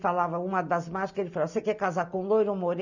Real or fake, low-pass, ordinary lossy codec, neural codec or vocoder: real; 7.2 kHz; none; none